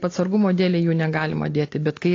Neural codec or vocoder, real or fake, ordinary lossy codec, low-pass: none; real; AAC, 32 kbps; 7.2 kHz